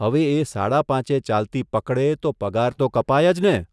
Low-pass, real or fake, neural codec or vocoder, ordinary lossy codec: none; real; none; none